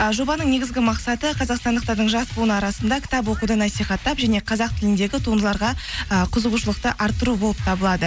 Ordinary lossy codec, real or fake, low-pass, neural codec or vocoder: none; real; none; none